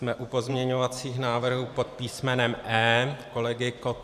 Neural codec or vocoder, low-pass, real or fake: vocoder, 44.1 kHz, 128 mel bands every 512 samples, BigVGAN v2; 14.4 kHz; fake